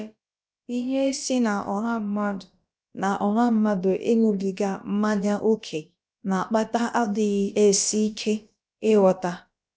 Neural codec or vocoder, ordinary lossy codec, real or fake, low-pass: codec, 16 kHz, about 1 kbps, DyCAST, with the encoder's durations; none; fake; none